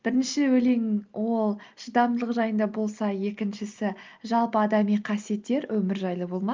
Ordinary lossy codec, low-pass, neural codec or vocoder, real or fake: Opus, 32 kbps; 7.2 kHz; none; real